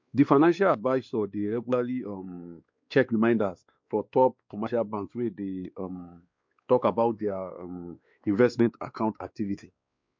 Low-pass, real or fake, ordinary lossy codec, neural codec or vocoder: 7.2 kHz; fake; MP3, 64 kbps; codec, 16 kHz, 2 kbps, X-Codec, WavLM features, trained on Multilingual LibriSpeech